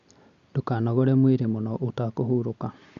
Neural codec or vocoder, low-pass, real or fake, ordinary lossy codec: none; 7.2 kHz; real; Opus, 64 kbps